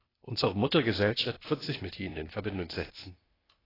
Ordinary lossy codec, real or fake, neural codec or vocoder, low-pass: AAC, 24 kbps; fake; codec, 16 kHz, 0.8 kbps, ZipCodec; 5.4 kHz